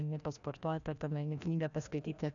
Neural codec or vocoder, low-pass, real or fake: codec, 16 kHz, 1 kbps, FreqCodec, larger model; 7.2 kHz; fake